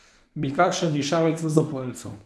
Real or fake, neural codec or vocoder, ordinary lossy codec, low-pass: fake; codec, 24 kHz, 0.9 kbps, WavTokenizer, medium speech release version 1; none; none